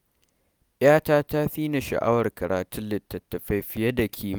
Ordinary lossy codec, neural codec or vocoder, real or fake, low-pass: none; none; real; none